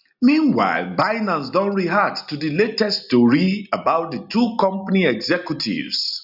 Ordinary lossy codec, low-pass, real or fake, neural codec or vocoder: none; 5.4 kHz; real; none